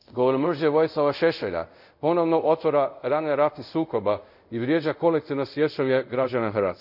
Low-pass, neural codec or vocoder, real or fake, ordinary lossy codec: 5.4 kHz; codec, 24 kHz, 0.5 kbps, DualCodec; fake; none